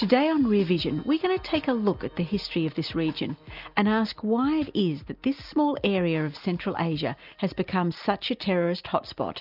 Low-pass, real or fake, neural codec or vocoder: 5.4 kHz; real; none